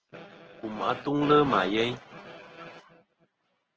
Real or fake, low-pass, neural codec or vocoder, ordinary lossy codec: real; 7.2 kHz; none; Opus, 16 kbps